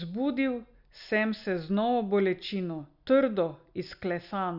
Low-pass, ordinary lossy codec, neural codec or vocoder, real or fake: 5.4 kHz; none; none; real